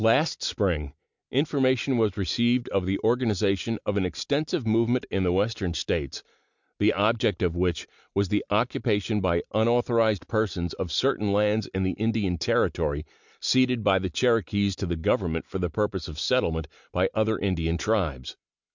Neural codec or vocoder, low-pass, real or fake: none; 7.2 kHz; real